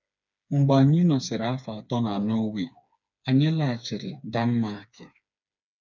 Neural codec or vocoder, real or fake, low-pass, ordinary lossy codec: codec, 16 kHz, 4 kbps, FreqCodec, smaller model; fake; 7.2 kHz; none